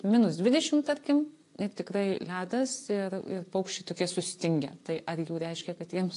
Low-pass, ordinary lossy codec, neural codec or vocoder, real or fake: 10.8 kHz; AAC, 48 kbps; vocoder, 24 kHz, 100 mel bands, Vocos; fake